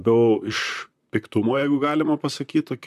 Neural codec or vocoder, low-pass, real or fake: vocoder, 44.1 kHz, 128 mel bands, Pupu-Vocoder; 14.4 kHz; fake